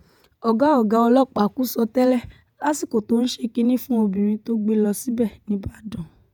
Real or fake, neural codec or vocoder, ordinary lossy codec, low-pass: fake; vocoder, 48 kHz, 128 mel bands, Vocos; none; none